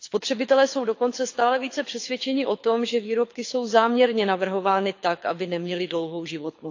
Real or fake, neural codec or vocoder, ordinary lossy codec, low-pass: fake; codec, 24 kHz, 6 kbps, HILCodec; AAC, 48 kbps; 7.2 kHz